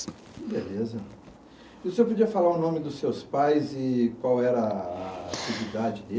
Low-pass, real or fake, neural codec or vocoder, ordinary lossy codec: none; real; none; none